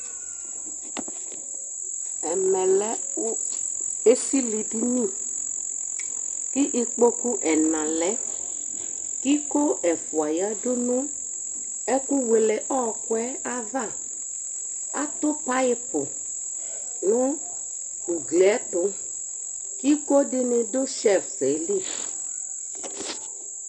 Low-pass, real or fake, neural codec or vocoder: 10.8 kHz; real; none